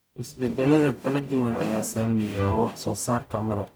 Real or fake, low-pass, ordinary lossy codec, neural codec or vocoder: fake; none; none; codec, 44.1 kHz, 0.9 kbps, DAC